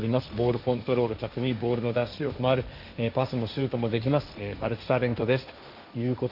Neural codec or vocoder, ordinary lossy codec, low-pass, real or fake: codec, 16 kHz, 1.1 kbps, Voila-Tokenizer; none; 5.4 kHz; fake